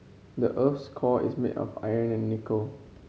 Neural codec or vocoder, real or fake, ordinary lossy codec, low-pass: none; real; none; none